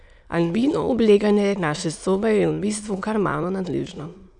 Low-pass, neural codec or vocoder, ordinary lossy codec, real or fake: 9.9 kHz; autoencoder, 22.05 kHz, a latent of 192 numbers a frame, VITS, trained on many speakers; none; fake